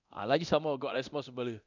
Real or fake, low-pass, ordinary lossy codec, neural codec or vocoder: fake; 7.2 kHz; none; codec, 24 kHz, 0.9 kbps, DualCodec